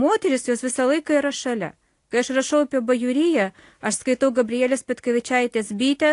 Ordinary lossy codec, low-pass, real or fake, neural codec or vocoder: AAC, 48 kbps; 10.8 kHz; real; none